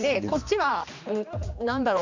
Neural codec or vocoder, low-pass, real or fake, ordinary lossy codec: codec, 16 kHz, 2 kbps, X-Codec, HuBERT features, trained on general audio; 7.2 kHz; fake; MP3, 64 kbps